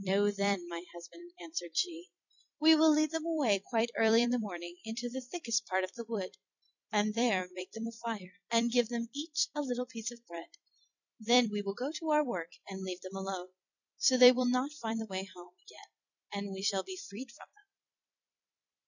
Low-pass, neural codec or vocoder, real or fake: 7.2 kHz; vocoder, 44.1 kHz, 128 mel bands every 256 samples, BigVGAN v2; fake